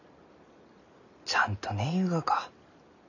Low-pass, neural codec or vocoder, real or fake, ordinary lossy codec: 7.2 kHz; none; real; none